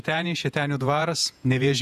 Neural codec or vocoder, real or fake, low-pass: vocoder, 48 kHz, 128 mel bands, Vocos; fake; 14.4 kHz